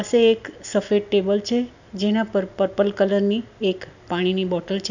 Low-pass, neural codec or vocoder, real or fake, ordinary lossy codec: 7.2 kHz; none; real; none